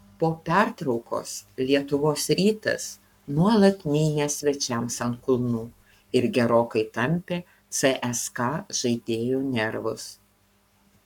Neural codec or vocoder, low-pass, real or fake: codec, 44.1 kHz, 7.8 kbps, Pupu-Codec; 19.8 kHz; fake